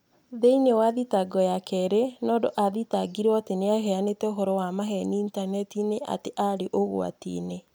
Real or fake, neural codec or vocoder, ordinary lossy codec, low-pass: real; none; none; none